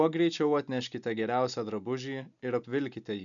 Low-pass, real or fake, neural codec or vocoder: 7.2 kHz; real; none